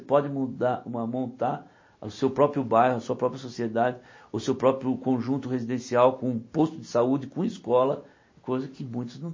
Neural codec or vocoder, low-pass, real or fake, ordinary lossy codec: none; 7.2 kHz; real; MP3, 32 kbps